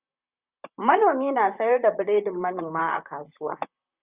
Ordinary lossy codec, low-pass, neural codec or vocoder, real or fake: Opus, 64 kbps; 3.6 kHz; vocoder, 44.1 kHz, 128 mel bands, Pupu-Vocoder; fake